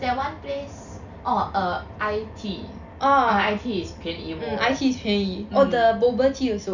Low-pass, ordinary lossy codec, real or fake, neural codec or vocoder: 7.2 kHz; none; real; none